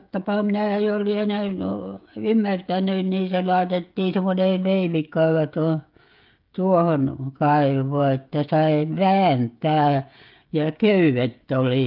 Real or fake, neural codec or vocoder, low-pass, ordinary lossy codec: fake; codec, 16 kHz, 16 kbps, FreqCodec, smaller model; 5.4 kHz; Opus, 24 kbps